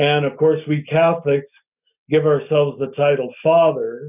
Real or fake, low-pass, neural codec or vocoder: real; 3.6 kHz; none